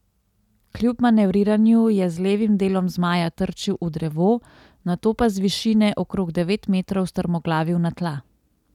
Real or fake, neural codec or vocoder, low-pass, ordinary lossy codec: real; none; 19.8 kHz; none